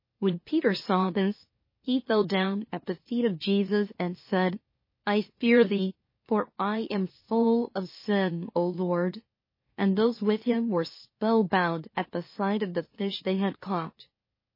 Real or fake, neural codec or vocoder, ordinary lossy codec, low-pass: fake; autoencoder, 44.1 kHz, a latent of 192 numbers a frame, MeloTTS; MP3, 24 kbps; 5.4 kHz